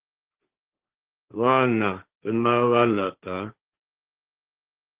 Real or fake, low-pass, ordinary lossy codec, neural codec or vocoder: fake; 3.6 kHz; Opus, 16 kbps; codec, 16 kHz, 1.1 kbps, Voila-Tokenizer